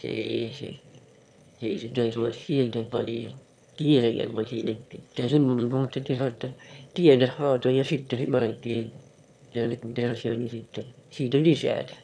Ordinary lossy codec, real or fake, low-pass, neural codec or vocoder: none; fake; none; autoencoder, 22.05 kHz, a latent of 192 numbers a frame, VITS, trained on one speaker